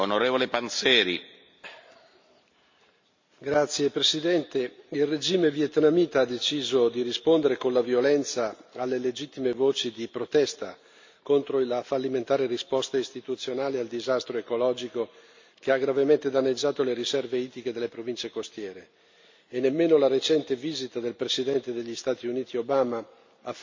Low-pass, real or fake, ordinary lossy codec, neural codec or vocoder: 7.2 kHz; real; MP3, 64 kbps; none